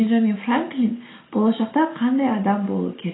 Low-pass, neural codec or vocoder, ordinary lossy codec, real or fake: 7.2 kHz; none; AAC, 16 kbps; real